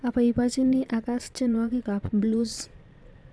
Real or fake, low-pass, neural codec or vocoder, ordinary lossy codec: fake; none; vocoder, 22.05 kHz, 80 mel bands, Vocos; none